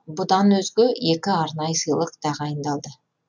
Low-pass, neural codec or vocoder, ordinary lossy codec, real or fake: 7.2 kHz; none; none; real